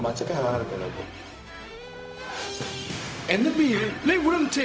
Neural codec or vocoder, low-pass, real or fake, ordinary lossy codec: codec, 16 kHz, 0.4 kbps, LongCat-Audio-Codec; none; fake; none